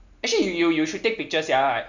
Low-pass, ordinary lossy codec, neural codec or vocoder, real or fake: 7.2 kHz; none; none; real